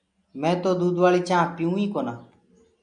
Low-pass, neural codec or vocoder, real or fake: 9.9 kHz; none; real